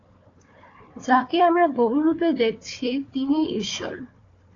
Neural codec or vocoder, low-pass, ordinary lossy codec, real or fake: codec, 16 kHz, 4 kbps, FunCodec, trained on Chinese and English, 50 frames a second; 7.2 kHz; AAC, 32 kbps; fake